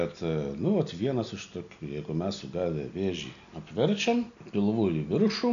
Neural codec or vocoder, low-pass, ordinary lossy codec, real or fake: none; 7.2 kHz; MP3, 96 kbps; real